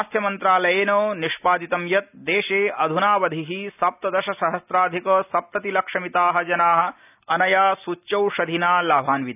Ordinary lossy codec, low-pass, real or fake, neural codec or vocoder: none; 3.6 kHz; real; none